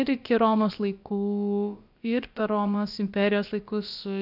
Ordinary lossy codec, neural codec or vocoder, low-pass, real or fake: AAC, 48 kbps; codec, 16 kHz, about 1 kbps, DyCAST, with the encoder's durations; 5.4 kHz; fake